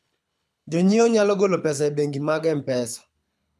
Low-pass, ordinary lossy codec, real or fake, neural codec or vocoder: none; none; fake; codec, 24 kHz, 6 kbps, HILCodec